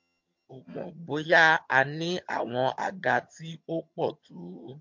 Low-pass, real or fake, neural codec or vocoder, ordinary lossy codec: 7.2 kHz; fake; vocoder, 22.05 kHz, 80 mel bands, HiFi-GAN; MP3, 48 kbps